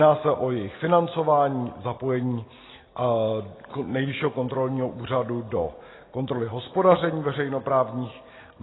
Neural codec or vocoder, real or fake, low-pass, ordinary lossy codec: none; real; 7.2 kHz; AAC, 16 kbps